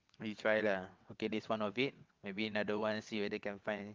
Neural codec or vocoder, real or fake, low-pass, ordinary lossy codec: vocoder, 22.05 kHz, 80 mel bands, WaveNeXt; fake; 7.2 kHz; Opus, 24 kbps